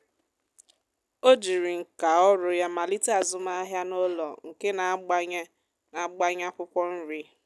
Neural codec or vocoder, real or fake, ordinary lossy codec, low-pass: none; real; none; none